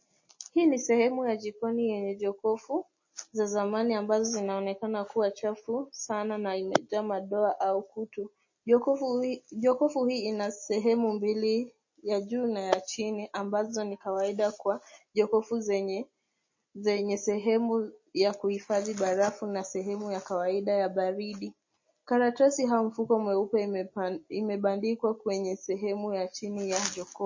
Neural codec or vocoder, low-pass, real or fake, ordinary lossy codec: none; 7.2 kHz; real; MP3, 32 kbps